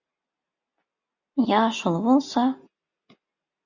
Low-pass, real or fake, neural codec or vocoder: 7.2 kHz; real; none